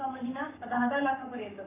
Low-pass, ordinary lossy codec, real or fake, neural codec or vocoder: 3.6 kHz; none; fake; codec, 44.1 kHz, 7.8 kbps, Pupu-Codec